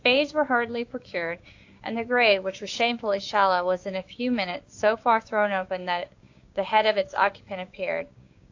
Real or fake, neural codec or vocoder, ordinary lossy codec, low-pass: fake; codec, 16 kHz, 6 kbps, DAC; AAC, 48 kbps; 7.2 kHz